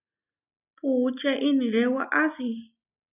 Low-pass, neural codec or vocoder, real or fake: 3.6 kHz; none; real